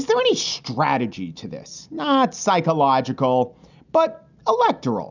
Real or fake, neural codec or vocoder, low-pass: real; none; 7.2 kHz